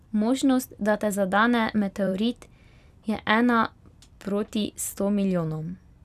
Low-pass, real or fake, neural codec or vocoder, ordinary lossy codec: 14.4 kHz; fake; vocoder, 44.1 kHz, 128 mel bands every 256 samples, BigVGAN v2; none